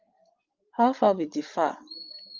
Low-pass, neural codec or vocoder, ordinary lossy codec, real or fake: 7.2 kHz; codec, 16 kHz, 16 kbps, FreqCodec, larger model; Opus, 32 kbps; fake